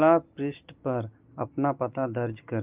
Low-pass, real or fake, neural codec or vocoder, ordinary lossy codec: 3.6 kHz; real; none; Opus, 24 kbps